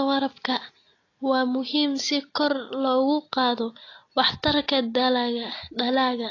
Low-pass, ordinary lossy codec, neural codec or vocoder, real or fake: 7.2 kHz; AAC, 32 kbps; none; real